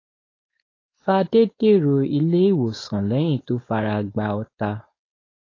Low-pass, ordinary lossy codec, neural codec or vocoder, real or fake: 7.2 kHz; AAC, 32 kbps; codec, 16 kHz, 4.8 kbps, FACodec; fake